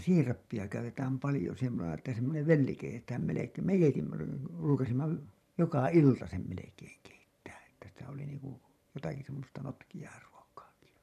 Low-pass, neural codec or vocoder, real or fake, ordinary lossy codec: 14.4 kHz; vocoder, 44.1 kHz, 128 mel bands every 512 samples, BigVGAN v2; fake; none